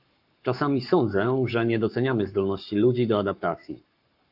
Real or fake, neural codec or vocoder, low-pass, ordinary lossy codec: fake; codec, 44.1 kHz, 7.8 kbps, Pupu-Codec; 5.4 kHz; AAC, 48 kbps